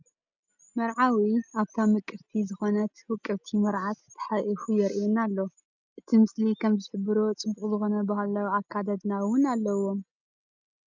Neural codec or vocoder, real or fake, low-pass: none; real; 7.2 kHz